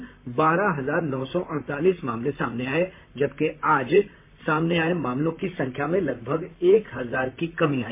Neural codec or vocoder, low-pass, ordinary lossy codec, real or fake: vocoder, 44.1 kHz, 128 mel bands, Pupu-Vocoder; 3.6 kHz; MP3, 24 kbps; fake